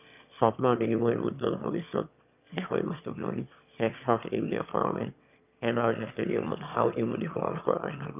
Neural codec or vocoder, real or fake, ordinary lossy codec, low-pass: autoencoder, 22.05 kHz, a latent of 192 numbers a frame, VITS, trained on one speaker; fake; none; 3.6 kHz